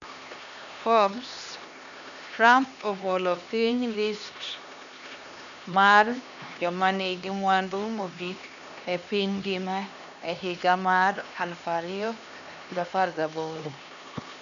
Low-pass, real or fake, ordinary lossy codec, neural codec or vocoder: 7.2 kHz; fake; none; codec, 16 kHz, 2 kbps, X-Codec, HuBERT features, trained on LibriSpeech